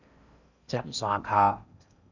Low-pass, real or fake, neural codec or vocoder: 7.2 kHz; fake; codec, 16 kHz in and 24 kHz out, 0.6 kbps, FocalCodec, streaming, 4096 codes